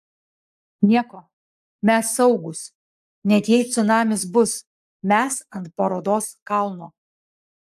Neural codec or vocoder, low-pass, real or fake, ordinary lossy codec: codec, 44.1 kHz, 7.8 kbps, Pupu-Codec; 14.4 kHz; fake; AAC, 96 kbps